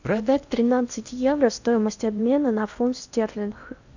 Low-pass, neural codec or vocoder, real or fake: 7.2 kHz; codec, 16 kHz in and 24 kHz out, 0.8 kbps, FocalCodec, streaming, 65536 codes; fake